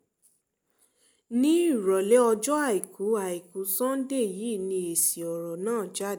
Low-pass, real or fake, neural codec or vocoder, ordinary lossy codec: none; real; none; none